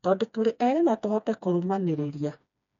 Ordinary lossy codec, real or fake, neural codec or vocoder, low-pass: none; fake; codec, 16 kHz, 2 kbps, FreqCodec, smaller model; 7.2 kHz